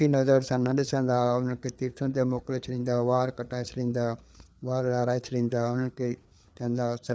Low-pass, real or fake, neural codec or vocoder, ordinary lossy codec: none; fake; codec, 16 kHz, 4 kbps, FreqCodec, larger model; none